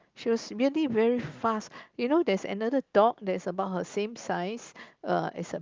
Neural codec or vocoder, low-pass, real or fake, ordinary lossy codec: none; 7.2 kHz; real; Opus, 32 kbps